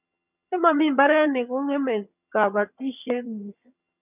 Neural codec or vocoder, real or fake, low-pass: vocoder, 22.05 kHz, 80 mel bands, HiFi-GAN; fake; 3.6 kHz